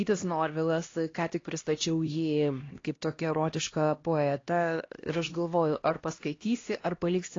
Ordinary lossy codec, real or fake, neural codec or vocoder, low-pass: AAC, 32 kbps; fake; codec, 16 kHz, 1 kbps, X-Codec, HuBERT features, trained on LibriSpeech; 7.2 kHz